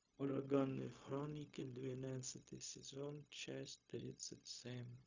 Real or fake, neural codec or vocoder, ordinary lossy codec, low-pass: fake; codec, 16 kHz, 0.4 kbps, LongCat-Audio-Codec; Opus, 64 kbps; 7.2 kHz